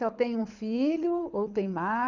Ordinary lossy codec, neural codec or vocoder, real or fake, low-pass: none; codec, 24 kHz, 6 kbps, HILCodec; fake; 7.2 kHz